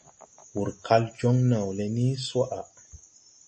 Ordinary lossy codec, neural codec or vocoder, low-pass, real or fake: MP3, 32 kbps; none; 10.8 kHz; real